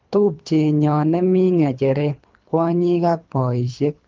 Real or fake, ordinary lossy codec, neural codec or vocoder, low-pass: fake; Opus, 24 kbps; codec, 24 kHz, 3 kbps, HILCodec; 7.2 kHz